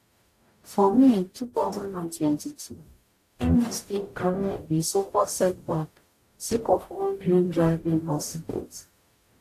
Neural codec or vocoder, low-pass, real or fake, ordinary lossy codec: codec, 44.1 kHz, 0.9 kbps, DAC; 14.4 kHz; fake; AAC, 64 kbps